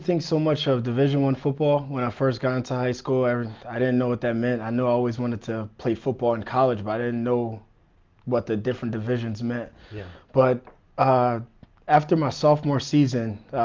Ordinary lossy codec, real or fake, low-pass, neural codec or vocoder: Opus, 32 kbps; real; 7.2 kHz; none